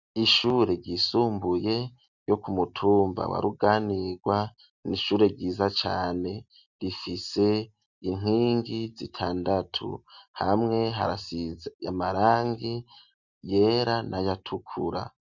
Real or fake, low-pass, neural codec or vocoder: real; 7.2 kHz; none